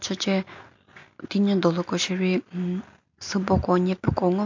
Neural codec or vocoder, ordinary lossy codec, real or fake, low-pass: none; MP3, 48 kbps; real; 7.2 kHz